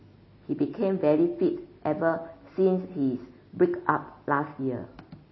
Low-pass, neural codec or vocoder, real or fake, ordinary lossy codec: 7.2 kHz; none; real; MP3, 24 kbps